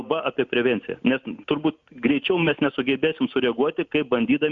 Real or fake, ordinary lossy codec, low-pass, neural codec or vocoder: real; Opus, 64 kbps; 7.2 kHz; none